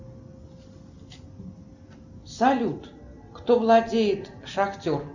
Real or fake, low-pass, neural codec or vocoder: real; 7.2 kHz; none